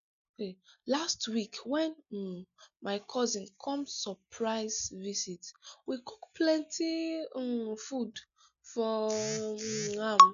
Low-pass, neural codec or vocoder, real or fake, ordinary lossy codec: 7.2 kHz; none; real; none